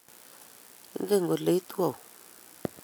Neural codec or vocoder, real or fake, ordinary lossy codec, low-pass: none; real; none; none